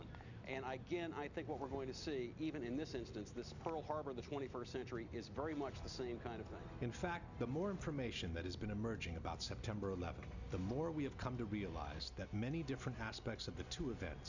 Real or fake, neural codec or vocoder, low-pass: real; none; 7.2 kHz